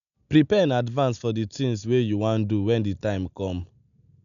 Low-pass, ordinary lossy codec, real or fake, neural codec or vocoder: 7.2 kHz; none; real; none